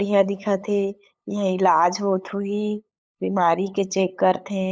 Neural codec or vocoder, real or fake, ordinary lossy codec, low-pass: codec, 16 kHz, 8 kbps, FunCodec, trained on LibriTTS, 25 frames a second; fake; none; none